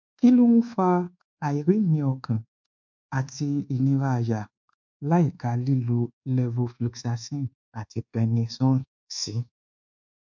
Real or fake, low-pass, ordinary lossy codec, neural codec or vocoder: fake; 7.2 kHz; MP3, 64 kbps; codec, 24 kHz, 1.2 kbps, DualCodec